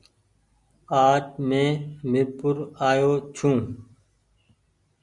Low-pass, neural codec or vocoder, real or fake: 10.8 kHz; none; real